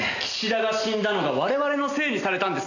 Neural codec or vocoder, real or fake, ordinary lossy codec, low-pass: none; real; none; 7.2 kHz